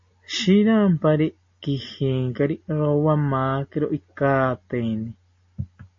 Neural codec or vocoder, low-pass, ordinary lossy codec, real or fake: none; 7.2 kHz; MP3, 32 kbps; real